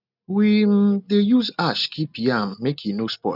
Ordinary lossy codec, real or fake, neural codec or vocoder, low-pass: none; real; none; 5.4 kHz